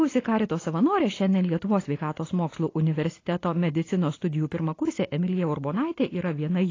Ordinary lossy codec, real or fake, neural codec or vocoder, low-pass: AAC, 32 kbps; real; none; 7.2 kHz